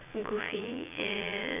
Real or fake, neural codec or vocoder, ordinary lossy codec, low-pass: fake; vocoder, 22.05 kHz, 80 mel bands, Vocos; none; 3.6 kHz